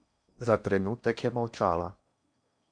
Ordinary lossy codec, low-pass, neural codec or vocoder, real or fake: MP3, 96 kbps; 9.9 kHz; codec, 16 kHz in and 24 kHz out, 0.8 kbps, FocalCodec, streaming, 65536 codes; fake